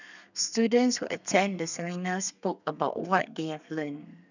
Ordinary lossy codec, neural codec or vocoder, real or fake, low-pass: none; codec, 32 kHz, 1.9 kbps, SNAC; fake; 7.2 kHz